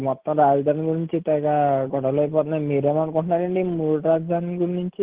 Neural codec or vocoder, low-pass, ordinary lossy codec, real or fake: none; 3.6 kHz; Opus, 16 kbps; real